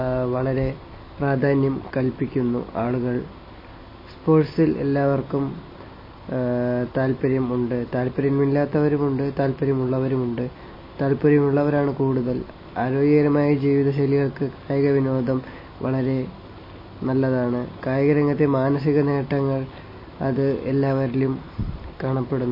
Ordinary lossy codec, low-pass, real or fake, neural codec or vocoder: MP3, 24 kbps; 5.4 kHz; real; none